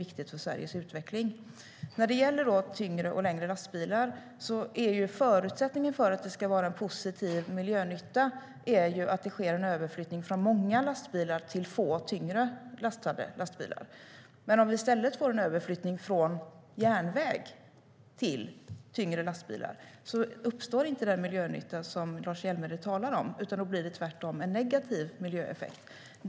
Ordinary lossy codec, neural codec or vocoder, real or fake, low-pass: none; none; real; none